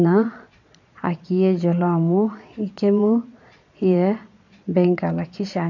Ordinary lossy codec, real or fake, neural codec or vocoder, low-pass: none; fake; vocoder, 22.05 kHz, 80 mel bands, Vocos; 7.2 kHz